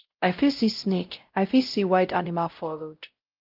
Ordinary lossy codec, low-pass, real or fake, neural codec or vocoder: Opus, 24 kbps; 5.4 kHz; fake; codec, 16 kHz, 0.5 kbps, X-Codec, WavLM features, trained on Multilingual LibriSpeech